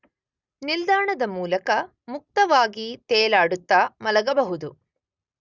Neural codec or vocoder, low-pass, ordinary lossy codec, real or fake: none; 7.2 kHz; Opus, 64 kbps; real